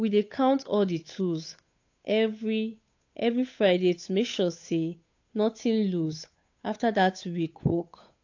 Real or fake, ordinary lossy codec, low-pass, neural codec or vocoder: fake; AAC, 48 kbps; 7.2 kHz; codec, 16 kHz, 8 kbps, FunCodec, trained on Chinese and English, 25 frames a second